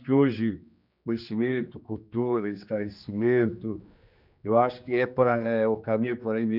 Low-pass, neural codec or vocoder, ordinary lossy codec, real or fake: 5.4 kHz; codec, 16 kHz, 2 kbps, X-Codec, HuBERT features, trained on general audio; none; fake